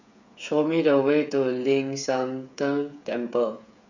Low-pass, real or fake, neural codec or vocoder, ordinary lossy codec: 7.2 kHz; fake; codec, 16 kHz, 8 kbps, FreqCodec, smaller model; none